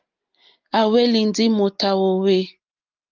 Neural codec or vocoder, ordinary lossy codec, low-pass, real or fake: none; Opus, 32 kbps; 7.2 kHz; real